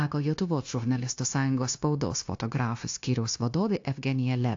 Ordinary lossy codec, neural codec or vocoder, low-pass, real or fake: AAC, 48 kbps; codec, 16 kHz, 0.9 kbps, LongCat-Audio-Codec; 7.2 kHz; fake